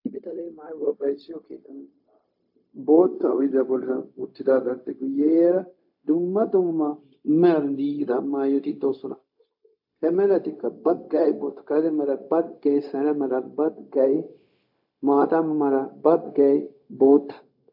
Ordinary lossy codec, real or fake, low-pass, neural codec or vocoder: AAC, 48 kbps; fake; 5.4 kHz; codec, 16 kHz, 0.4 kbps, LongCat-Audio-Codec